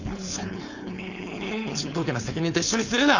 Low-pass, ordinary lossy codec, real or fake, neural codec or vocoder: 7.2 kHz; none; fake; codec, 16 kHz, 4.8 kbps, FACodec